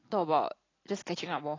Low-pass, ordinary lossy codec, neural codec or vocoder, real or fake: 7.2 kHz; AAC, 32 kbps; none; real